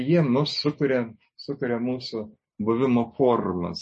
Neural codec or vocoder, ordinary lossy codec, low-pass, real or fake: none; MP3, 32 kbps; 9.9 kHz; real